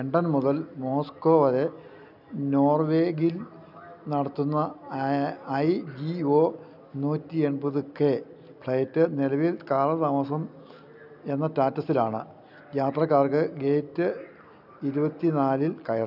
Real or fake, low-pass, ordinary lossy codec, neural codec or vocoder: real; 5.4 kHz; none; none